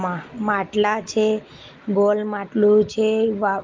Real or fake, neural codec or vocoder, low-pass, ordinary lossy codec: real; none; 7.2 kHz; Opus, 32 kbps